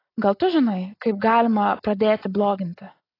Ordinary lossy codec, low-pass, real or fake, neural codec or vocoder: AAC, 24 kbps; 5.4 kHz; real; none